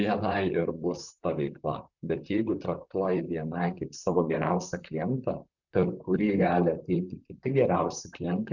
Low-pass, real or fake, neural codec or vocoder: 7.2 kHz; fake; vocoder, 44.1 kHz, 128 mel bands, Pupu-Vocoder